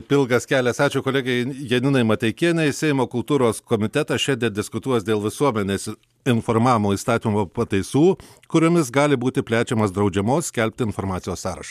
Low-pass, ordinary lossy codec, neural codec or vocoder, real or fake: 14.4 kHz; MP3, 96 kbps; none; real